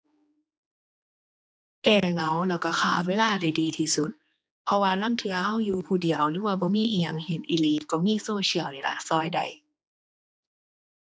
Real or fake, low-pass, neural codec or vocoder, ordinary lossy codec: fake; none; codec, 16 kHz, 2 kbps, X-Codec, HuBERT features, trained on general audio; none